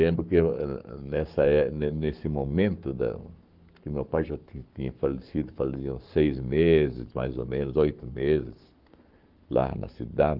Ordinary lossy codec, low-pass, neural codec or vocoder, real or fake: Opus, 32 kbps; 5.4 kHz; codec, 44.1 kHz, 7.8 kbps, Pupu-Codec; fake